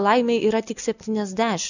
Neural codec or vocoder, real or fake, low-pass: none; real; 7.2 kHz